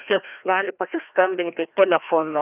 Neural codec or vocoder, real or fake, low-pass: codec, 16 kHz, 1 kbps, FreqCodec, larger model; fake; 3.6 kHz